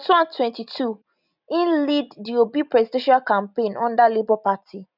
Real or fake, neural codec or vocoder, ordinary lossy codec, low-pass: real; none; none; 5.4 kHz